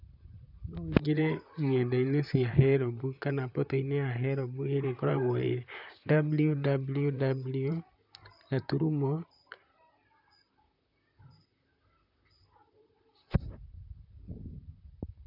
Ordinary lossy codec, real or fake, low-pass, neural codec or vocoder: none; fake; 5.4 kHz; vocoder, 44.1 kHz, 128 mel bands, Pupu-Vocoder